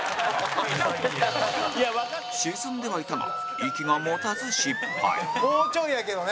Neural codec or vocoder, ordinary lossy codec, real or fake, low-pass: none; none; real; none